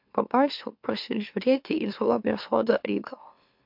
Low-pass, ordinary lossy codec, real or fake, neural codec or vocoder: 5.4 kHz; MP3, 48 kbps; fake; autoencoder, 44.1 kHz, a latent of 192 numbers a frame, MeloTTS